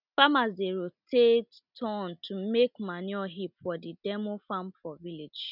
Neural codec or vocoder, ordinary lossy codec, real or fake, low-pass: none; none; real; 5.4 kHz